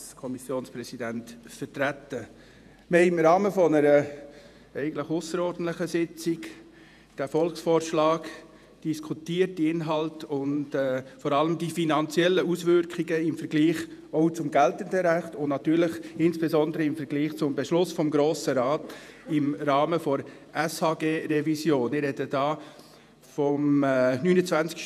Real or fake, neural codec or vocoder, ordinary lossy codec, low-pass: fake; vocoder, 48 kHz, 128 mel bands, Vocos; none; 14.4 kHz